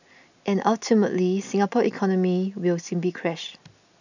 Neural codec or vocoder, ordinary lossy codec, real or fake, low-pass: none; none; real; 7.2 kHz